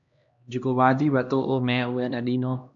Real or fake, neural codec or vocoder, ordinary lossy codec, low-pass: fake; codec, 16 kHz, 1 kbps, X-Codec, HuBERT features, trained on LibriSpeech; AAC, 64 kbps; 7.2 kHz